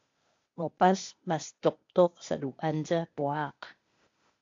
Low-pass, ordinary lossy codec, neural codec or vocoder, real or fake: 7.2 kHz; AAC, 48 kbps; codec, 16 kHz, 0.8 kbps, ZipCodec; fake